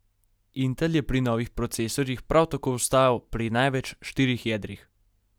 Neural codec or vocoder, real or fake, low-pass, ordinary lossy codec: none; real; none; none